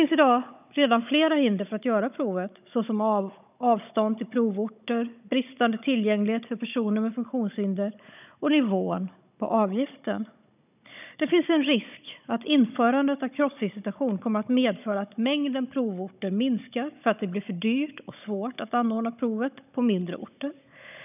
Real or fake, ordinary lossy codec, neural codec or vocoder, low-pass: fake; none; codec, 16 kHz, 16 kbps, FunCodec, trained on Chinese and English, 50 frames a second; 3.6 kHz